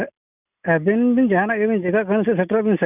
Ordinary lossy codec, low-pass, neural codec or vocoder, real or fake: none; 3.6 kHz; none; real